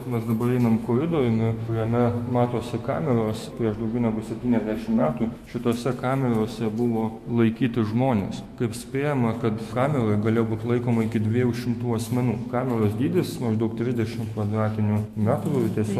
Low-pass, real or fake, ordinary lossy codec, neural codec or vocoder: 14.4 kHz; fake; MP3, 64 kbps; autoencoder, 48 kHz, 128 numbers a frame, DAC-VAE, trained on Japanese speech